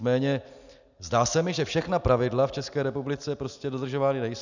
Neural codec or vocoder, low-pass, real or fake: none; 7.2 kHz; real